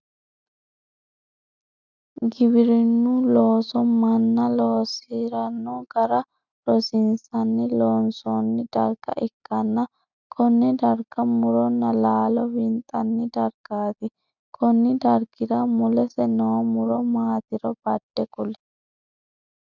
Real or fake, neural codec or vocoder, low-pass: real; none; 7.2 kHz